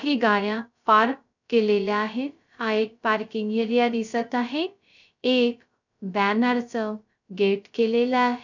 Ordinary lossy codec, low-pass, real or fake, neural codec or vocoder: none; 7.2 kHz; fake; codec, 16 kHz, 0.2 kbps, FocalCodec